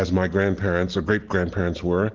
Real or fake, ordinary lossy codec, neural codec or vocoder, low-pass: real; Opus, 16 kbps; none; 7.2 kHz